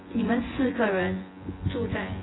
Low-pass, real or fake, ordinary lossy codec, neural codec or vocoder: 7.2 kHz; fake; AAC, 16 kbps; vocoder, 24 kHz, 100 mel bands, Vocos